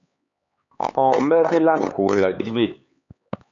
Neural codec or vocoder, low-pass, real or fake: codec, 16 kHz, 4 kbps, X-Codec, HuBERT features, trained on LibriSpeech; 7.2 kHz; fake